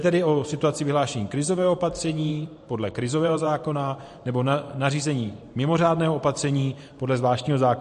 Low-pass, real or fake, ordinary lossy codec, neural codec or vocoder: 14.4 kHz; fake; MP3, 48 kbps; vocoder, 44.1 kHz, 128 mel bands every 512 samples, BigVGAN v2